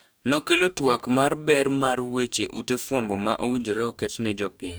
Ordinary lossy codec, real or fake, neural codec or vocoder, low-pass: none; fake; codec, 44.1 kHz, 2.6 kbps, DAC; none